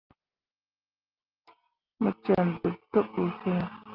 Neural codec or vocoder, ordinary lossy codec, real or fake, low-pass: none; Opus, 24 kbps; real; 5.4 kHz